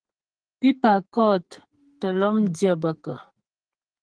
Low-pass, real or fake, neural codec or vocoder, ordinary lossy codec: 9.9 kHz; fake; codec, 44.1 kHz, 2.6 kbps, SNAC; Opus, 24 kbps